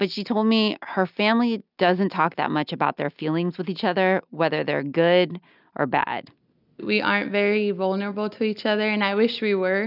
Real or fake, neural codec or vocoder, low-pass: real; none; 5.4 kHz